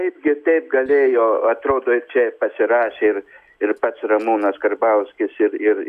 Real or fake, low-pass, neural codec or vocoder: real; 14.4 kHz; none